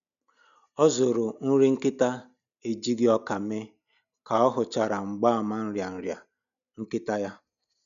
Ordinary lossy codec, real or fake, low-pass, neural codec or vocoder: none; real; 7.2 kHz; none